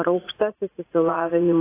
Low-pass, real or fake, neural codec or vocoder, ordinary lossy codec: 3.6 kHz; fake; vocoder, 44.1 kHz, 80 mel bands, Vocos; AAC, 24 kbps